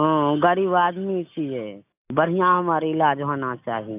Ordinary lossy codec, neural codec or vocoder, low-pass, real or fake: AAC, 32 kbps; none; 3.6 kHz; real